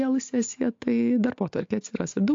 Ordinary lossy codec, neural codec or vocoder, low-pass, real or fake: AAC, 48 kbps; none; 7.2 kHz; real